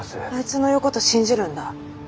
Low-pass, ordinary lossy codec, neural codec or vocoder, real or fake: none; none; none; real